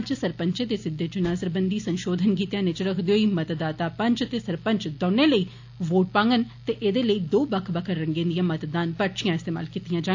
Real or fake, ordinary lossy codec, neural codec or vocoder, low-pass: real; AAC, 48 kbps; none; 7.2 kHz